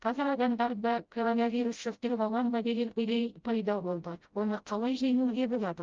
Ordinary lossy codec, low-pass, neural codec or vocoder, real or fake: Opus, 24 kbps; 7.2 kHz; codec, 16 kHz, 0.5 kbps, FreqCodec, smaller model; fake